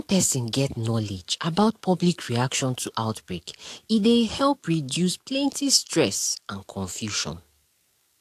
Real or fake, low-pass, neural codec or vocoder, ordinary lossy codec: fake; 14.4 kHz; codec, 44.1 kHz, 7.8 kbps, DAC; AAC, 64 kbps